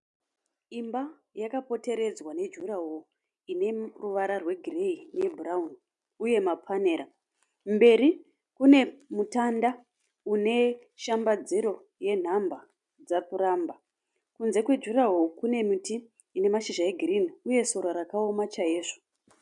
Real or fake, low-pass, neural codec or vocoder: real; 10.8 kHz; none